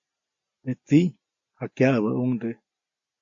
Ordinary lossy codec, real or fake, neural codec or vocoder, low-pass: AAC, 64 kbps; real; none; 7.2 kHz